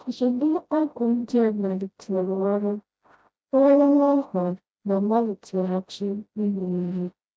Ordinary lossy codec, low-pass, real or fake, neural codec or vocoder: none; none; fake; codec, 16 kHz, 0.5 kbps, FreqCodec, smaller model